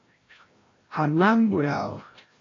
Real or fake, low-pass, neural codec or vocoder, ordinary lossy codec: fake; 7.2 kHz; codec, 16 kHz, 0.5 kbps, FreqCodec, larger model; AAC, 32 kbps